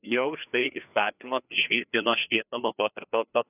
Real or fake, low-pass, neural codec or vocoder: fake; 3.6 kHz; codec, 16 kHz, 2 kbps, FreqCodec, larger model